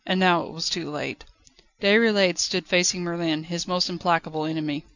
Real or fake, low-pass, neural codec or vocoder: real; 7.2 kHz; none